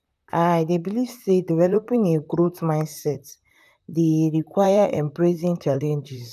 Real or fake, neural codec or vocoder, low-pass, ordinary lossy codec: fake; vocoder, 44.1 kHz, 128 mel bands, Pupu-Vocoder; 14.4 kHz; none